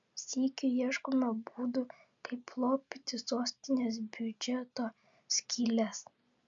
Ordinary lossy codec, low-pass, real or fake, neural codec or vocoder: MP3, 64 kbps; 7.2 kHz; real; none